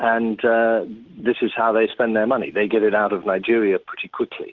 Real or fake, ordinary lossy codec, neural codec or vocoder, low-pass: real; Opus, 16 kbps; none; 7.2 kHz